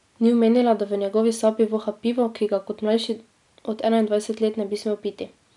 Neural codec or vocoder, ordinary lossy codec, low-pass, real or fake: none; none; 10.8 kHz; real